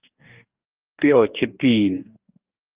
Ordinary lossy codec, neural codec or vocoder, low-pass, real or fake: Opus, 24 kbps; codec, 44.1 kHz, 2.6 kbps, DAC; 3.6 kHz; fake